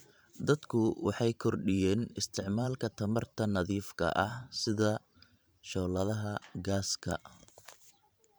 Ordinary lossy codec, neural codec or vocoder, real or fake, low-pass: none; vocoder, 44.1 kHz, 128 mel bands every 512 samples, BigVGAN v2; fake; none